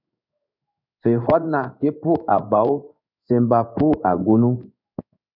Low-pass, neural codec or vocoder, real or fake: 5.4 kHz; codec, 16 kHz in and 24 kHz out, 1 kbps, XY-Tokenizer; fake